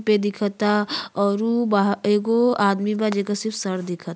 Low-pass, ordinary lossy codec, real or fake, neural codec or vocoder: none; none; real; none